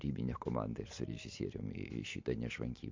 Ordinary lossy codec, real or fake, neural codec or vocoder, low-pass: MP3, 48 kbps; real; none; 7.2 kHz